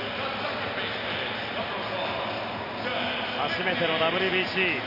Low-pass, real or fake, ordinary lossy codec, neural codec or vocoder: 5.4 kHz; real; none; none